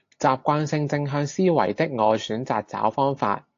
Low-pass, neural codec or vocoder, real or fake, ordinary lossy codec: 7.2 kHz; none; real; AAC, 48 kbps